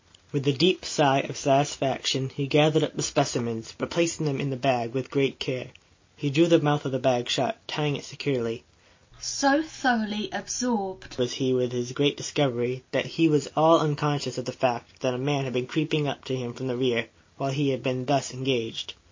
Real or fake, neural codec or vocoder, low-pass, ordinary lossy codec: real; none; 7.2 kHz; MP3, 32 kbps